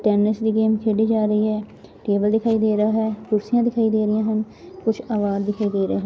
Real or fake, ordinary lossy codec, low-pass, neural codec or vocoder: real; none; none; none